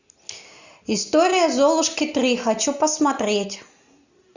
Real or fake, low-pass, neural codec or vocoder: real; 7.2 kHz; none